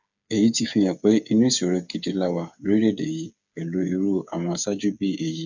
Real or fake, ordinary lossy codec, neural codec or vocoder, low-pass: fake; none; codec, 16 kHz, 8 kbps, FreqCodec, smaller model; 7.2 kHz